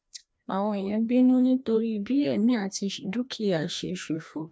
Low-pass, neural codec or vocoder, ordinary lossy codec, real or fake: none; codec, 16 kHz, 1 kbps, FreqCodec, larger model; none; fake